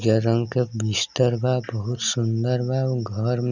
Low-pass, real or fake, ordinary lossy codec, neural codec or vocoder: 7.2 kHz; real; none; none